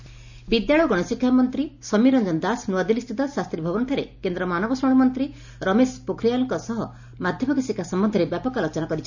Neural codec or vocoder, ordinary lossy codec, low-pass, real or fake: none; none; 7.2 kHz; real